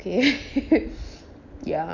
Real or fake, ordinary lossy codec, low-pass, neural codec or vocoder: real; none; 7.2 kHz; none